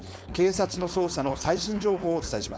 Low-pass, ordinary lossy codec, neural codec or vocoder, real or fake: none; none; codec, 16 kHz, 4.8 kbps, FACodec; fake